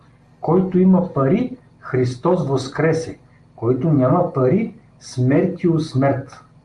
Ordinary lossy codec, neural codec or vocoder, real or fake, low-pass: Opus, 32 kbps; none; real; 10.8 kHz